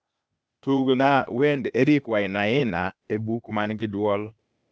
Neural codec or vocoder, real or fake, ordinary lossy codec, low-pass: codec, 16 kHz, 0.8 kbps, ZipCodec; fake; none; none